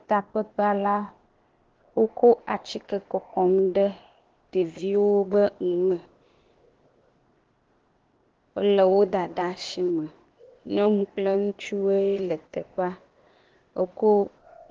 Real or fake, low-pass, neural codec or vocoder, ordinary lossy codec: fake; 7.2 kHz; codec, 16 kHz, 0.8 kbps, ZipCodec; Opus, 16 kbps